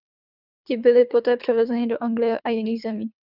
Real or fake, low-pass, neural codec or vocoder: fake; 5.4 kHz; codec, 24 kHz, 3 kbps, HILCodec